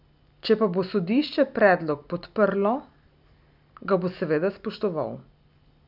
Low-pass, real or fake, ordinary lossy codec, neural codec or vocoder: 5.4 kHz; real; none; none